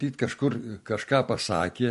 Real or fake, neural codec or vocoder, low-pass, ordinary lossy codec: real; none; 14.4 kHz; MP3, 48 kbps